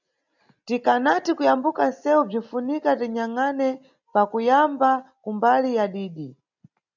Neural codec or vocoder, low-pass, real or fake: none; 7.2 kHz; real